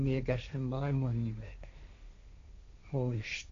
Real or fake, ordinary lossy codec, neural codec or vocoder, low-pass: fake; AAC, 48 kbps; codec, 16 kHz, 1.1 kbps, Voila-Tokenizer; 7.2 kHz